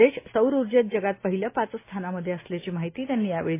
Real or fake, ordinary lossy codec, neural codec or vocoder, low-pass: real; AAC, 24 kbps; none; 3.6 kHz